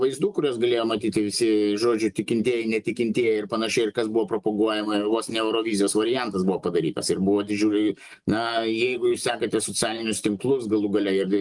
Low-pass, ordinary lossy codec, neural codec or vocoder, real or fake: 10.8 kHz; Opus, 32 kbps; none; real